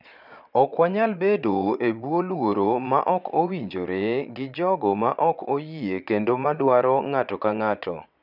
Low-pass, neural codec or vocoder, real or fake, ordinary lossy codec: 5.4 kHz; vocoder, 22.05 kHz, 80 mel bands, Vocos; fake; none